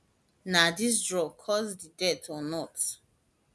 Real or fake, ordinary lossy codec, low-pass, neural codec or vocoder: real; none; none; none